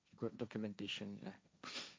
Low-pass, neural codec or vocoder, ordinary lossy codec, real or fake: none; codec, 16 kHz, 1.1 kbps, Voila-Tokenizer; none; fake